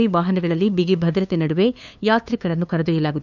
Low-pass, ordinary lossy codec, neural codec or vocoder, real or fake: 7.2 kHz; none; codec, 16 kHz, 2 kbps, FunCodec, trained on LibriTTS, 25 frames a second; fake